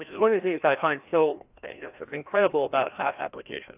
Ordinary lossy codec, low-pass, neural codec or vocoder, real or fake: AAC, 32 kbps; 3.6 kHz; codec, 16 kHz, 1 kbps, FreqCodec, larger model; fake